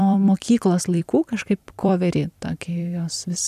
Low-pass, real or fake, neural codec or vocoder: 14.4 kHz; fake; vocoder, 44.1 kHz, 128 mel bands every 256 samples, BigVGAN v2